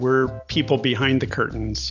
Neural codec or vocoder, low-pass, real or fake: none; 7.2 kHz; real